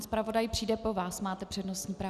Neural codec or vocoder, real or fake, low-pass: none; real; 14.4 kHz